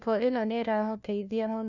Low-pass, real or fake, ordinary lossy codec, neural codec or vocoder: 7.2 kHz; fake; none; codec, 16 kHz, 1 kbps, FunCodec, trained on LibriTTS, 50 frames a second